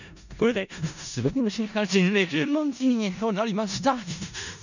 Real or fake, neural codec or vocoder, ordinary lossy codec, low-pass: fake; codec, 16 kHz in and 24 kHz out, 0.4 kbps, LongCat-Audio-Codec, four codebook decoder; none; 7.2 kHz